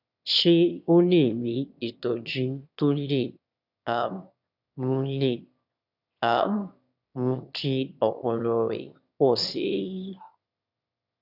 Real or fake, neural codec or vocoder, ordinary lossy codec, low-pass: fake; autoencoder, 22.05 kHz, a latent of 192 numbers a frame, VITS, trained on one speaker; none; 5.4 kHz